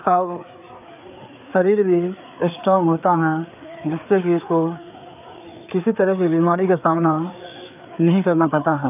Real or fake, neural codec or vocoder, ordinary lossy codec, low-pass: fake; codec, 16 kHz, 4 kbps, FreqCodec, larger model; none; 3.6 kHz